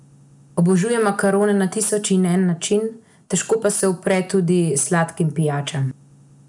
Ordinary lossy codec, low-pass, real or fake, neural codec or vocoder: none; 10.8 kHz; real; none